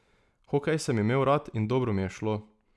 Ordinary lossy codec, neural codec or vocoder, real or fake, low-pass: none; none; real; none